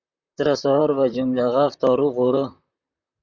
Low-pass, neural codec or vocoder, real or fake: 7.2 kHz; vocoder, 44.1 kHz, 128 mel bands, Pupu-Vocoder; fake